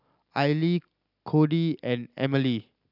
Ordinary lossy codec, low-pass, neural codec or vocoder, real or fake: none; 5.4 kHz; none; real